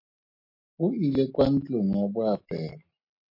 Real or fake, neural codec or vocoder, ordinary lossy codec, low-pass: real; none; MP3, 32 kbps; 5.4 kHz